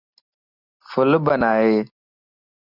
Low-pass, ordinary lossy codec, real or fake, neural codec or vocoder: 5.4 kHz; Opus, 64 kbps; real; none